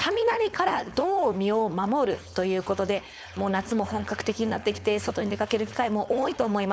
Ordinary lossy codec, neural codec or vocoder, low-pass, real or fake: none; codec, 16 kHz, 4.8 kbps, FACodec; none; fake